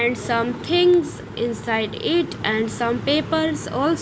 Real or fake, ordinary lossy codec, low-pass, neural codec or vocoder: real; none; none; none